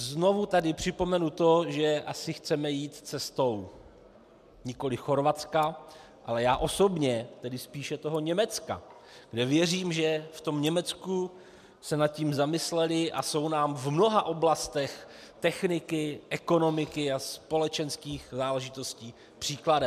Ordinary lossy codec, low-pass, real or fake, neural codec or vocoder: MP3, 96 kbps; 14.4 kHz; real; none